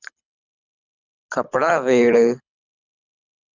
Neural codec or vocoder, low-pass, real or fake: codec, 24 kHz, 6 kbps, HILCodec; 7.2 kHz; fake